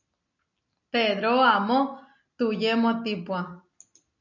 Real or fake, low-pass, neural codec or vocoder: real; 7.2 kHz; none